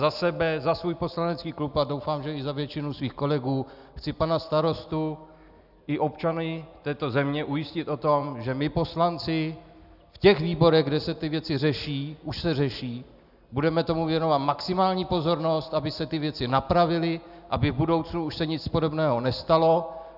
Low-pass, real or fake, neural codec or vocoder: 5.4 kHz; real; none